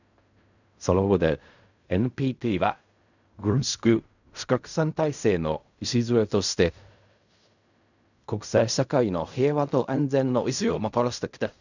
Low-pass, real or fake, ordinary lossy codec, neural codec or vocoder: 7.2 kHz; fake; none; codec, 16 kHz in and 24 kHz out, 0.4 kbps, LongCat-Audio-Codec, fine tuned four codebook decoder